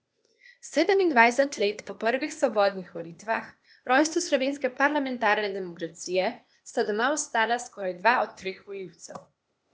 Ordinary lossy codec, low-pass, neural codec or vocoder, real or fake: none; none; codec, 16 kHz, 0.8 kbps, ZipCodec; fake